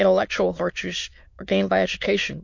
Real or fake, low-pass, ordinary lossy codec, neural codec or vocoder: fake; 7.2 kHz; MP3, 48 kbps; autoencoder, 22.05 kHz, a latent of 192 numbers a frame, VITS, trained on many speakers